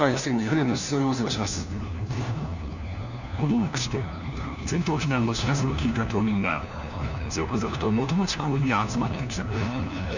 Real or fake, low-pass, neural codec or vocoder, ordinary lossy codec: fake; 7.2 kHz; codec, 16 kHz, 1 kbps, FunCodec, trained on LibriTTS, 50 frames a second; none